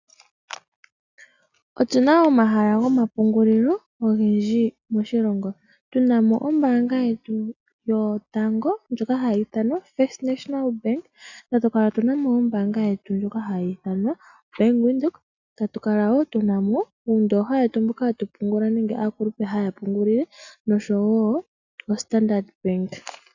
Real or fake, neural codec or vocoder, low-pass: real; none; 7.2 kHz